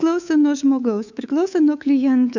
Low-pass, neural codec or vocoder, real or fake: 7.2 kHz; codec, 24 kHz, 3.1 kbps, DualCodec; fake